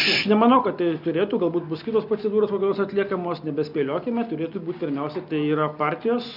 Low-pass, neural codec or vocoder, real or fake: 5.4 kHz; none; real